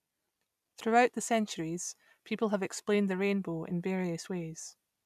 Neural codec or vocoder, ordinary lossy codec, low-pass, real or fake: none; none; 14.4 kHz; real